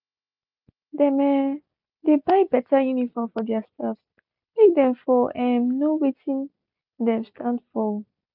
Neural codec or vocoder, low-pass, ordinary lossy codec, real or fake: none; 5.4 kHz; none; real